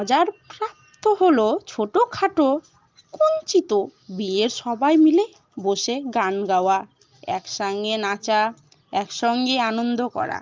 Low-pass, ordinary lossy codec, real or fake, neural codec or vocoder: 7.2 kHz; Opus, 32 kbps; real; none